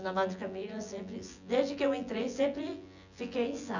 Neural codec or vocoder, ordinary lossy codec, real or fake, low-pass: vocoder, 24 kHz, 100 mel bands, Vocos; none; fake; 7.2 kHz